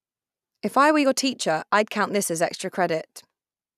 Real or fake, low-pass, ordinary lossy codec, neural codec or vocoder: real; 14.4 kHz; none; none